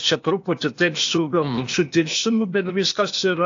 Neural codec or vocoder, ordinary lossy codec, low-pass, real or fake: codec, 16 kHz, 0.8 kbps, ZipCodec; AAC, 48 kbps; 7.2 kHz; fake